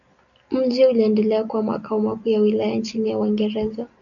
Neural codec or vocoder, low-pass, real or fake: none; 7.2 kHz; real